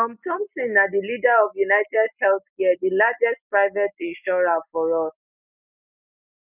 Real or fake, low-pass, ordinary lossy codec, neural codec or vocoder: real; 3.6 kHz; none; none